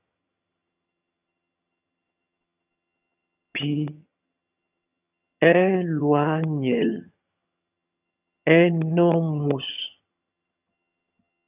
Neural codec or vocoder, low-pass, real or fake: vocoder, 22.05 kHz, 80 mel bands, HiFi-GAN; 3.6 kHz; fake